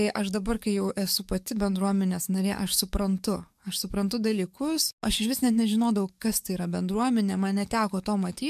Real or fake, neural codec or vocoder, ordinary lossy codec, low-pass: fake; codec, 44.1 kHz, 7.8 kbps, DAC; MP3, 96 kbps; 14.4 kHz